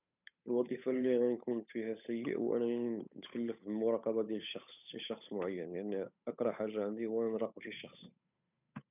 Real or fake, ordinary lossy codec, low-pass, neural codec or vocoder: fake; none; 3.6 kHz; codec, 16 kHz, 8 kbps, FunCodec, trained on Chinese and English, 25 frames a second